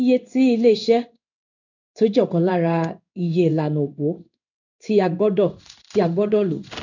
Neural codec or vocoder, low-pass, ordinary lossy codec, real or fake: codec, 16 kHz in and 24 kHz out, 1 kbps, XY-Tokenizer; 7.2 kHz; none; fake